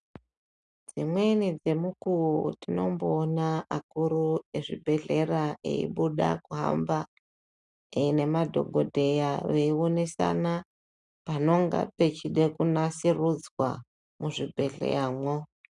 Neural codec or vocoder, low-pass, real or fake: none; 10.8 kHz; real